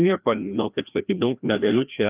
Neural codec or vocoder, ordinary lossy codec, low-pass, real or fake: codec, 16 kHz, 1 kbps, FreqCodec, larger model; Opus, 32 kbps; 3.6 kHz; fake